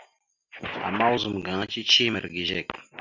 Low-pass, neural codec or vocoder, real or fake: 7.2 kHz; none; real